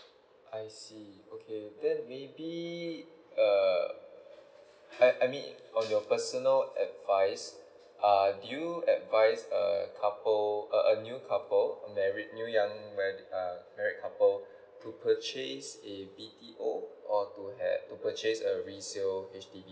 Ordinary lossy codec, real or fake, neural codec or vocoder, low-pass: none; real; none; none